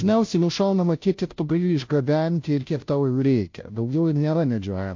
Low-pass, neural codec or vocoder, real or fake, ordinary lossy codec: 7.2 kHz; codec, 16 kHz, 0.5 kbps, FunCodec, trained on Chinese and English, 25 frames a second; fake; MP3, 48 kbps